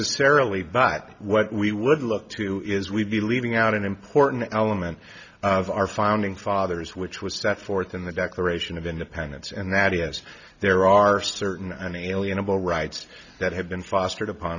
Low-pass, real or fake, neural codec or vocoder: 7.2 kHz; real; none